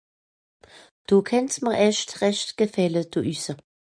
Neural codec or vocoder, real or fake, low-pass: none; real; 9.9 kHz